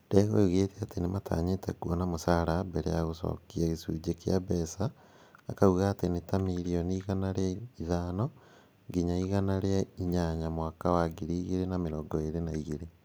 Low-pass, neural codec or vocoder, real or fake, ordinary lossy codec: none; none; real; none